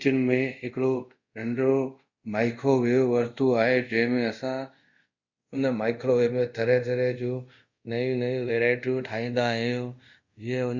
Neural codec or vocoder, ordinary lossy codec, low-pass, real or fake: codec, 24 kHz, 0.5 kbps, DualCodec; Opus, 64 kbps; 7.2 kHz; fake